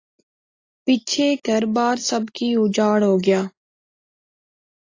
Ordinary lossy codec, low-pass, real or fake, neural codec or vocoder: AAC, 32 kbps; 7.2 kHz; real; none